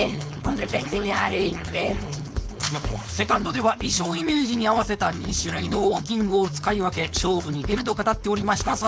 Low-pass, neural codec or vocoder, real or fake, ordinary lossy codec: none; codec, 16 kHz, 4.8 kbps, FACodec; fake; none